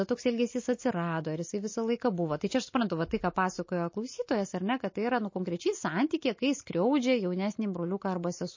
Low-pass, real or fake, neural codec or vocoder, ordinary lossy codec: 7.2 kHz; real; none; MP3, 32 kbps